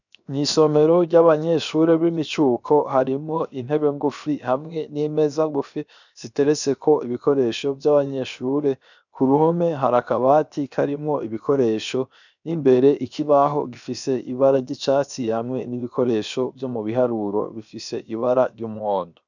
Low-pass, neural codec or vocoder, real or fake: 7.2 kHz; codec, 16 kHz, 0.7 kbps, FocalCodec; fake